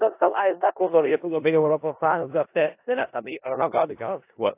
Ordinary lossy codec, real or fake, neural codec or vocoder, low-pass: AAC, 24 kbps; fake; codec, 16 kHz in and 24 kHz out, 0.4 kbps, LongCat-Audio-Codec, four codebook decoder; 3.6 kHz